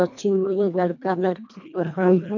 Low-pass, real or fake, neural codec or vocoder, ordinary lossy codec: 7.2 kHz; fake; codec, 24 kHz, 1.5 kbps, HILCodec; none